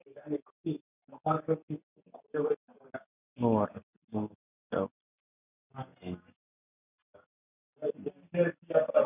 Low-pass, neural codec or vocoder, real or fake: 3.6 kHz; none; real